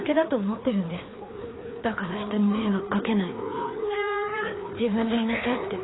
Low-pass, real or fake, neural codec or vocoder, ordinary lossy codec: 7.2 kHz; fake; codec, 16 kHz, 2 kbps, FreqCodec, larger model; AAC, 16 kbps